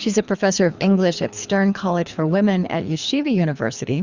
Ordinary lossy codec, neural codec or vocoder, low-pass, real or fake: Opus, 64 kbps; codec, 24 kHz, 3 kbps, HILCodec; 7.2 kHz; fake